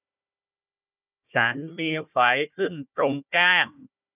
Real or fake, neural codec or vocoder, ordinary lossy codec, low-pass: fake; codec, 16 kHz, 1 kbps, FunCodec, trained on Chinese and English, 50 frames a second; none; 3.6 kHz